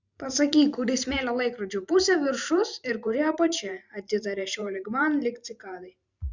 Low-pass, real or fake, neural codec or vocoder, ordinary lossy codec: 7.2 kHz; fake; vocoder, 44.1 kHz, 128 mel bands every 512 samples, BigVGAN v2; Opus, 64 kbps